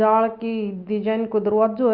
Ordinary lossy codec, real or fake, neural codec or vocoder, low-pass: Opus, 24 kbps; real; none; 5.4 kHz